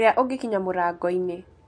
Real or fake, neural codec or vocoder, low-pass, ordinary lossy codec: real; none; 9.9 kHz; MP3, 48 kbps